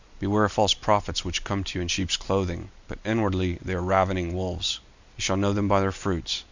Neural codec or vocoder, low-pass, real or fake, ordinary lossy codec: none; 7.2 kHz; real; Opus, 64 kbps